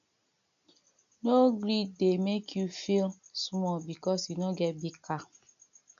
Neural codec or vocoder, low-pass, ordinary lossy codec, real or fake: none; 7.2 kHz; none; real